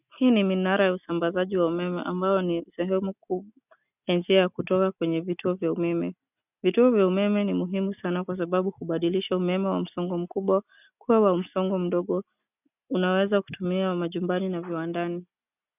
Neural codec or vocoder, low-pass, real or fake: none; 3.6 kHz; real